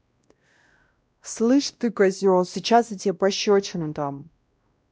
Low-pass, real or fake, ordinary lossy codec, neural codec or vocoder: none; fake; none; codec, 16 kHz, 1 kbps, X-Codec, WavLM features, trained on Multilingual LibriSpeech